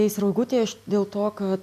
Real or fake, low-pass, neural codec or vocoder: real; 14.4 kHz; none